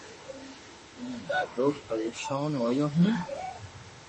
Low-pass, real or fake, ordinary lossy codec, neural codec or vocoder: 10.8 kHz; fake; MP3, 32 kbps; autoencoder, 48 kHz, 32 numbers a frame, DAC-VAE, trained on Japanese speech